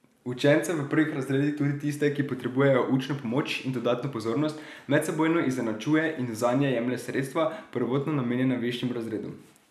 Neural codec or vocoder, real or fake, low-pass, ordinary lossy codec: none; real; 14.4 kHz; none